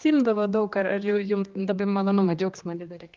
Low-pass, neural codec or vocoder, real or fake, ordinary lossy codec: 7.2 kHz; codec, 16 kHz, 2 kbps, X-Codec, HuBERT features, trained on general audio; fake; Opus, 32 kbps